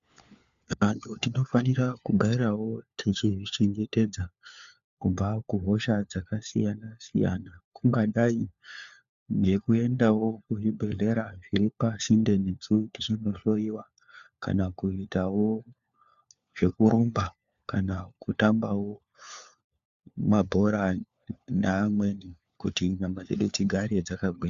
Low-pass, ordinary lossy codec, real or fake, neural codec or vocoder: 7.2 kHz; Opus, 64 kbps; fake; codec, 16 kHz, 4 kbps, FunCodec, trained on LibriTTS, 50 frames a second